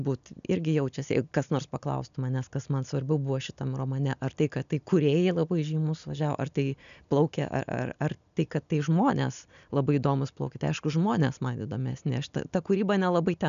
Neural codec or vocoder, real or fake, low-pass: none; real; 7.2 kHz